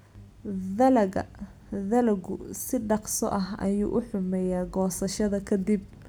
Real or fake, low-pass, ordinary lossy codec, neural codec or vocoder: real; none; none; none